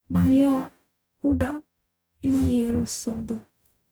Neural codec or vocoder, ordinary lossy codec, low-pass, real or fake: codec, 44.1 kHz, 0.9 kbps, DAC; none; none; fake